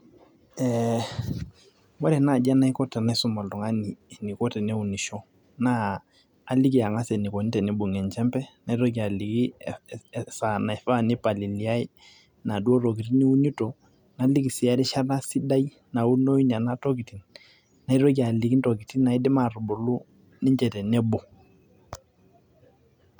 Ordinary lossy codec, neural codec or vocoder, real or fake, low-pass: none; none; real; 19.8 kHz